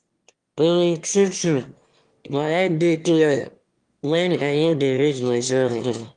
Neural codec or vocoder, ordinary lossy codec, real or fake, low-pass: autoencoder, 22.05 kHz, a latent of 192 numbers a frame, VITS, trained on one speaker; Opus, 24 kbps; fake; 9.9 kHz